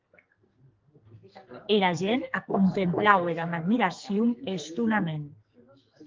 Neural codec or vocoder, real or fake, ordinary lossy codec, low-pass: codec, 44.1 kHz, 2.6 kbps, SNAC; fake; Opus, 32 kbps; 7.2 kHz